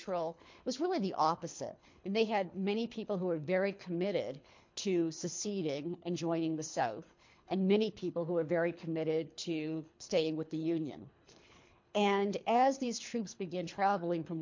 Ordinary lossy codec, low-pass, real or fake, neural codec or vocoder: MP3, 48 kbps; 7.2 kHz; fake; codec, 24 kHz, 3 kbps, HILCodec